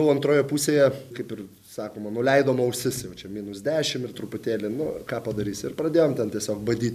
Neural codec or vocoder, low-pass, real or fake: none; 14.4 kHz; real